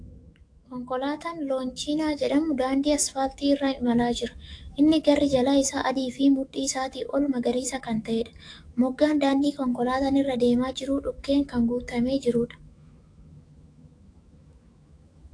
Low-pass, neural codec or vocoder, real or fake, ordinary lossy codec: 9.9 kHz; autoencoder, 48 kHz, 128 numbers a frame, DAC-VAE, trained on Japanese speech; fake; AAC, 48 kbps